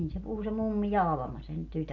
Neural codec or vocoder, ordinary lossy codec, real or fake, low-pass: none; none; real; 7.2 kHz